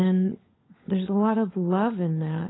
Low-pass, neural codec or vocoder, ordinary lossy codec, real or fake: 7.2 kHz; codec, 16 kHz, 16 kbps, FunCodec, trained on LibriTTS, 50 frames a second; AAC, 16 kbps; fake